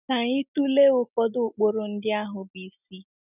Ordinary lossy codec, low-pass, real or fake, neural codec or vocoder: none; 3.6 kHz; real; none